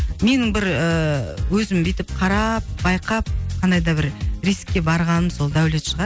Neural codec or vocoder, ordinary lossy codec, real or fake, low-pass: none; none; real; none